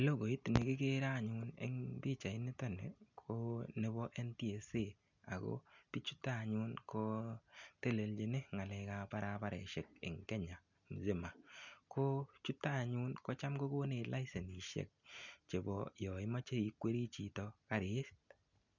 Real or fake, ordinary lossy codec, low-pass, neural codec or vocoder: real; none; 7.2 kHz; none